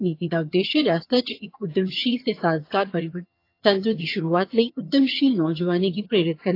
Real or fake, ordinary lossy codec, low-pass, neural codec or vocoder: fake; AAC, 32 kbps; 5.4 kHz; vocoder, 22.05 kHz, 80 mel bands, HiFi-GAN